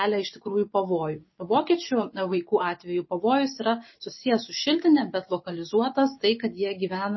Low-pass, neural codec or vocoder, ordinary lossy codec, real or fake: 7.2 kHz; none; MP3, 24 kbps; real